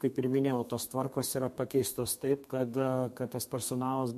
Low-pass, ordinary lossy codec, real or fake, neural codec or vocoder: 14.4 kHz; MP3, 64 kbps; fake; codec, 32 kHz, 1.9 kbps, SNAC